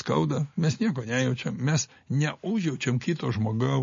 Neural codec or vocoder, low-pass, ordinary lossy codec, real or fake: none; 7.2 kHz; MP3, 32 kbps; real